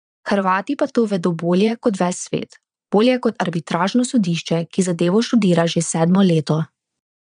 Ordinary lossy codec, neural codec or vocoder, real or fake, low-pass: none; vocoder, 22.05 kHz, 80 mel bands, Vocos; fake; 9.9 kHz